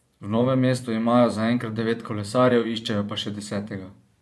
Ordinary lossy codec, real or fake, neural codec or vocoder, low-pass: none; fake; vocoder, 24 kHz, 100 mel bands, Vocos; none